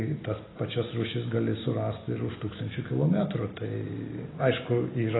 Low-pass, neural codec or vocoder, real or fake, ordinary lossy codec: 7.2 kHz; none; real; AAC, 16 kbps